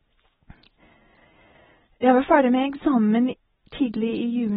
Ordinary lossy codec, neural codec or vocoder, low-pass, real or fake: AAC, 16 kbps; none; 19.8 kHz; real